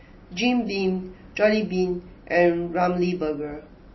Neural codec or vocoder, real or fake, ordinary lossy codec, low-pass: none; real; MP3, 24 kbps; 7.2 kHz